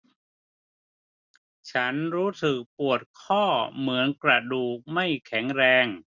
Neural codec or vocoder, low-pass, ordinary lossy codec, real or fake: none; 7.2 kHz; none; real